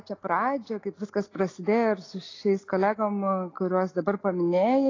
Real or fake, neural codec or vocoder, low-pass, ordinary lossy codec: real; none; 7.2 kHz; AAC, 32 kbps